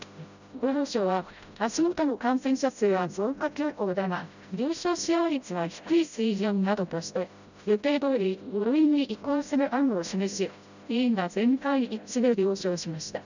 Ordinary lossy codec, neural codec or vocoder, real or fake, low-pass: none; codec, 16 kHz, 0.5 kbps, FreqCodec, smaller model; fake; 7.2 kHz